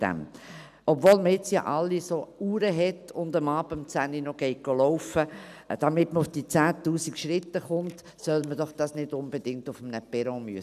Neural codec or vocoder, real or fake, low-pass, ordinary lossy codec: none; real; 14.4 kHz; none